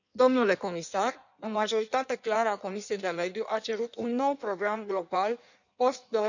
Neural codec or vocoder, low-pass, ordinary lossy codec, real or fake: codec, 16 kHz in and 24 kHz out, 1.1 kbps, FireRedTTS-2 codec; 7.2 kHz; none; fake